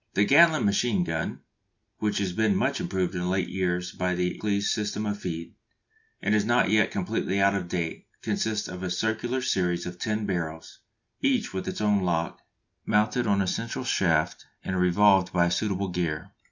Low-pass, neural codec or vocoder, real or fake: 7.2 kHz; none; real